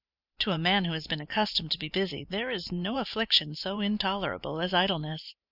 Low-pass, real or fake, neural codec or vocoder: 5.4 kHz; fake; vocoder, 44.1 kHz, 128 mel bands every 512 samples, BigVGAN v2